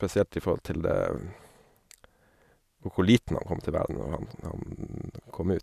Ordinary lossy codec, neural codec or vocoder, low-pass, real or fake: none; none; 14.4 kHz; real